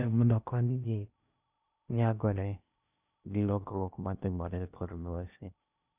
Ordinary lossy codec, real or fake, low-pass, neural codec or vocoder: none; fake; 3.6 kHz; codec, 16 kHz in and 24 kHz out, 0.6 kbps, FocalCodec, streaming, 2048 codes